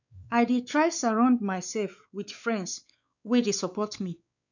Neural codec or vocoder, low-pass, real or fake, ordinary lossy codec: codec, 16 kHz, 4 kbps, X-Codec, WavLM features, trained on Multilingual LibriSpeech; 7.2 kHz; fake; none